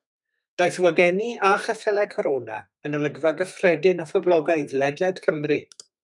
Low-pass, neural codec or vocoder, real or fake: 10.8 kHz; codec, 32 kHz, 1.9 kbps, SNAC; fake